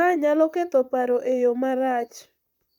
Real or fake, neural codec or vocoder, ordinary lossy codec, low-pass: fake; vocoder, 44.1 kHz, 128 mel bands, Pupu-Vocoder; none; 19.8 kHz